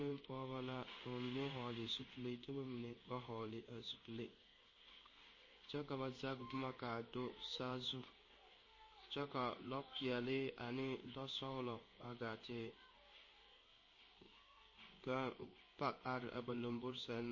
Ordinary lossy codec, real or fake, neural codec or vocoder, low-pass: MP3, 32 kbps; fake; codec, 16 kHz in and 24 kHz out, 1 kbps, XY-Tokenizer; 7.2 kHz